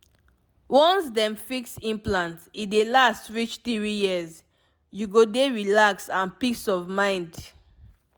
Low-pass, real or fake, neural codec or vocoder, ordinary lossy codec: none; real; none; none